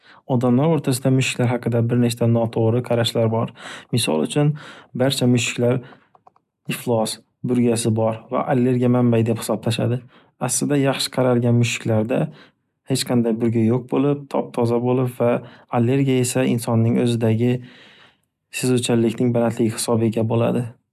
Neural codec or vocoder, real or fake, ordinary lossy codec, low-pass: none; real; none; 14.4 kHz